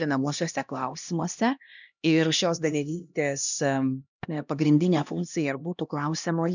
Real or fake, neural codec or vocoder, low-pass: fake; codec, 16 kHz, 1 kbps, X-Codec, HuBERT features, trained on LibriSpeech; 7.2 kHz